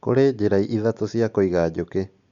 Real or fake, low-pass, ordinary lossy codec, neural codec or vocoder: real; 7.2 kHz; Opus, 64 kbps; none